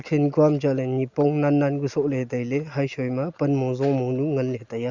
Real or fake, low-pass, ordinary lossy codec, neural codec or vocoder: real; 7.2 kHz; Opus, 64 kbps; none